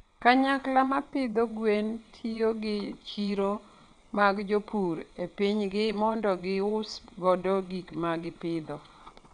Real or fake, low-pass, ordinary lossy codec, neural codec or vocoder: fake; 9.9 kHz; none; vocoder, 22.05 kHz, 80 mel bands, WaveNeXt